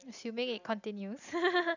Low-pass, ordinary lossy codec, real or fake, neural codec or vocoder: 7.2 kHz; none; real; none